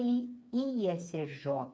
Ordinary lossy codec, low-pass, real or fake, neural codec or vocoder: none; none; fake; codec, 16 kHz, 4 kbps, FreqCodec, smaller model